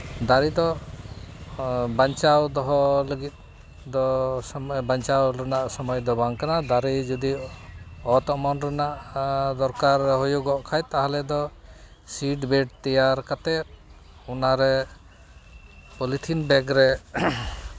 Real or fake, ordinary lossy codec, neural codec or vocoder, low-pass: real; none; none; none